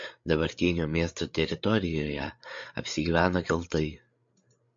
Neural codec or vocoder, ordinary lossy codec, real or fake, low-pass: codec, 16 kHz, 8 kbps, FreqCodec, larger model; MP3, 48 kbps; fake; 7.2 kHz